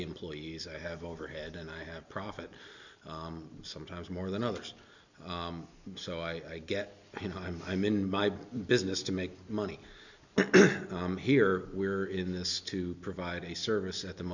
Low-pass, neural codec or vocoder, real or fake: 7.2 kHz; none; real